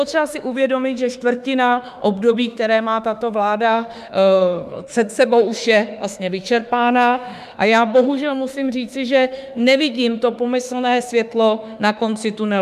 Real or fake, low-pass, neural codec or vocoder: fake; 14.4 kHz; autoencoder, 48 kHz, 32 numbers a frame, DAC-VAE, trained on Japanese speech